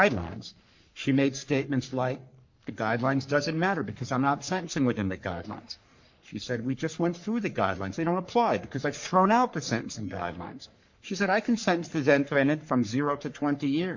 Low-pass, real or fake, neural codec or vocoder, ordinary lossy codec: 7.2 kHz; fake; codec, 44.1 kHz, 3.4 kbps, Pupu-Codec; MP3, 48 kbps